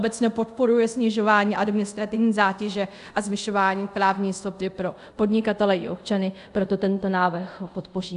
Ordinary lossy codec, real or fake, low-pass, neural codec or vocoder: AAC, 96 kbps; fake; 10.8 kHz; codec, 24 kHz, 0.5 kbps, DualCodec